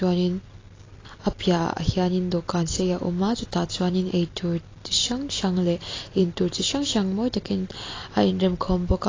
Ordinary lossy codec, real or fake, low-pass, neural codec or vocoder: AAC, 32 kbps; real; 7.2 kHz; none